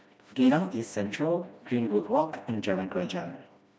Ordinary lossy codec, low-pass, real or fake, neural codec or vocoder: none; none; fake; codec, 16 kHz, 1 kbps, FreqCodec, smaller model